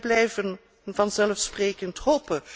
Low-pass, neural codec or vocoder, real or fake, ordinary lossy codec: none; none; real; none